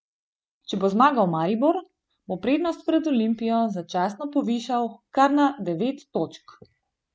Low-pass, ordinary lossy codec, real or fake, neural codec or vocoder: none; none; real; none